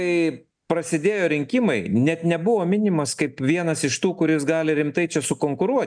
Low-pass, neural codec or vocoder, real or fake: 9.9 kHz; none; real